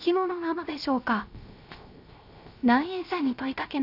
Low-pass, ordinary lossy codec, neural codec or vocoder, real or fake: 5.4 kHz; none; codec, 16 kHz, 0.3 kbps, FocalCodec; fake